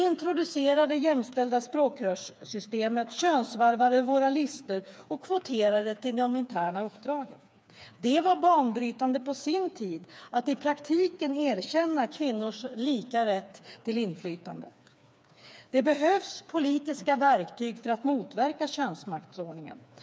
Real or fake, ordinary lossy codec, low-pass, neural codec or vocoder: fake; none; none; codec, 16 kHz, 4 kbps, FreqCodec, smaller model